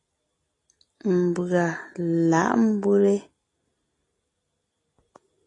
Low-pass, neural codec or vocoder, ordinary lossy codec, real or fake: 10.8 kHz; none; AAC, 32 kbps; real